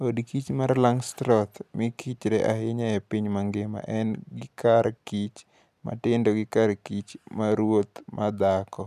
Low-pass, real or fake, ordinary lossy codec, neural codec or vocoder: 14.4 kHz; real; none; none